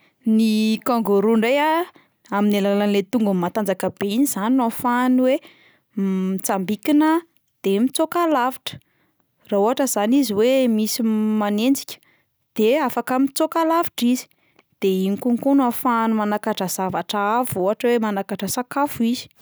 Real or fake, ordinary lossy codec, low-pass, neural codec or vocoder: real; none; none; none